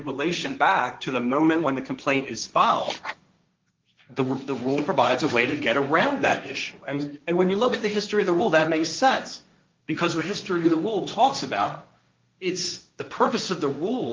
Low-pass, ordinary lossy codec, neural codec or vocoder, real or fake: 7.2 kHz; Opus, 32 kbps; codec, 16 kHz, 1.1 kbps, Voila-Tokenizer; fake